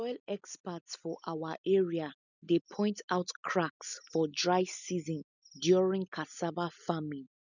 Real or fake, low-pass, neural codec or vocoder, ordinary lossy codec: real; 7.2 kHz; none; none